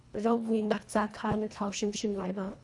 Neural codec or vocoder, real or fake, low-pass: codec, 24 kHz, 1.5 kbps, HILCodec; fake; 10.8 kHz